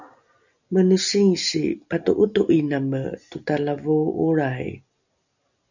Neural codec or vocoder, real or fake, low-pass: none; real; 7.2 kHz